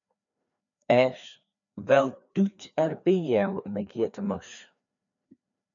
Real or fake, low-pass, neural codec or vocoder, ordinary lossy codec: fake; 7.2 kHz; codec, 16 kHz, 2 kbps, FreqCodec, larger model; MP3, 96 kbps